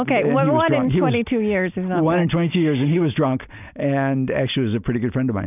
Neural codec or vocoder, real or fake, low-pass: none; real; 3.6 kHz